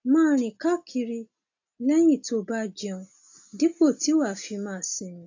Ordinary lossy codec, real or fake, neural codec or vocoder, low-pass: AAC, 48 kbps; real; none; 7.2 kHz